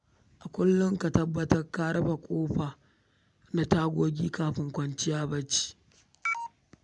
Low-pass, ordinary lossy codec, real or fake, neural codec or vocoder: 10.8 kHz; MP3, 96 kbps; real; none